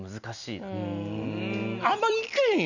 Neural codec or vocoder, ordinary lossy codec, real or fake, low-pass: none; none; real; 7.2 kHz